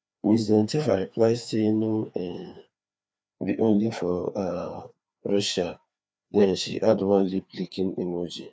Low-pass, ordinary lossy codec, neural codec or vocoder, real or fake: none; none; codec, 16 kHz, 2 kbps, FreqCodec, larger model; fake